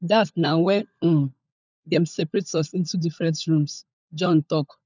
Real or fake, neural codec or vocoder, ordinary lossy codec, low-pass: fake; codec, 16 kHz, 16 kbps, FunCodec, trained on LibriTTS, 50 frames a second; none; 7.2 kHz